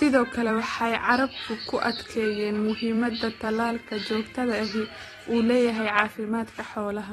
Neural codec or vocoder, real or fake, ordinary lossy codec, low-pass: vocoder, 44.1 kHz, 128 mel bands every 256 samples, BigVGAN v2; fake; AAC, 32 kbps; 19.8 kHz